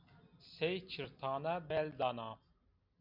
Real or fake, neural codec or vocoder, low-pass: real; none; 5.4 kHz